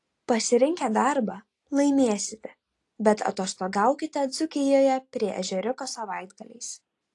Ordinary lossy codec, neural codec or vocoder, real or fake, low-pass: AAC, 48 kbps; none; real; 10.8 kHz